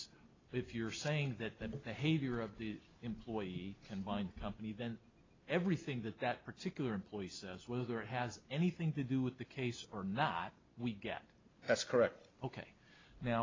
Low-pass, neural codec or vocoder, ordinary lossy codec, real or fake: 7.2 kHz; none; AAC, 32 kbps; real